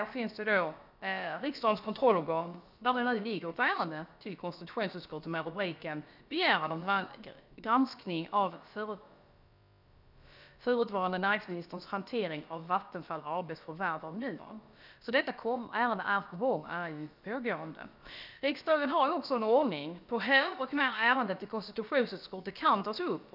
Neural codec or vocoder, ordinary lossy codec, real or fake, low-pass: codec, 16 kHz, about 1 kbps, DyCAST, with the encoder's durations; none; fake; 5.4 kHz